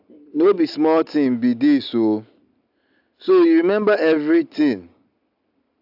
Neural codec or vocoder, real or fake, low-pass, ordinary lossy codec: none; real; 5.4 kHz; AAC, 48 kbps